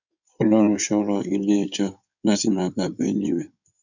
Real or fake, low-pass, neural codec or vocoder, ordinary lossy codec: fake; 7.2 kHz; codec, 16 kHz in and 24 kHz out, 2.2 kbps, FireRedTTS-2 codec; none